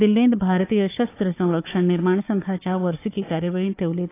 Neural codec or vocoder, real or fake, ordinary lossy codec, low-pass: codec, 16 kHz, 4 kbps, FunCodec, trained on Chinese and English, 50 frames a second; fake; AAC, 24 kbps; 3.6 kHz